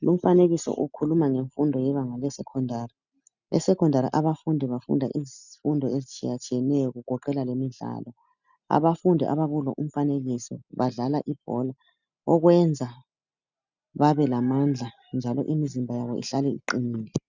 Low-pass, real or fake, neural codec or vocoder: 7.2 kHz; real; none